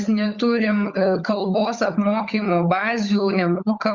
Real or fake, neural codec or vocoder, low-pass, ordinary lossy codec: fake; codec, 16 kHz, 16 kbps, FunCodec, trained on LibriTTS, 50 frames a second; 7.2 kHz; Opus, 64 kbps